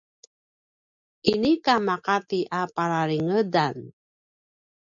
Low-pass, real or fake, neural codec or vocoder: 7.2 kHz; real; none